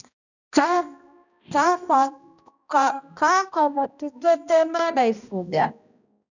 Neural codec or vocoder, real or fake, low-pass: codec, 16 kHz, 1 kbps, X-Codec, HuBERT features, trained on balanced general audio; fake; 7.2 kHz